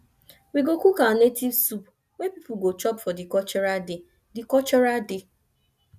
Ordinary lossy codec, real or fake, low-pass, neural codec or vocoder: none; real; 14.4 kHz; none